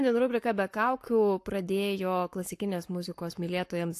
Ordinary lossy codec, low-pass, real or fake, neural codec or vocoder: AAC, 64 kbps; 14.4 kHz; fake; codec, 44.1 kHz, 7.8 kbps, Pupu-Codec